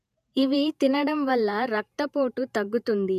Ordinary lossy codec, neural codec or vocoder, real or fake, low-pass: none; vocoder, 48 kHz, 128 mel bands, Vocos; fake; 14.4 kHz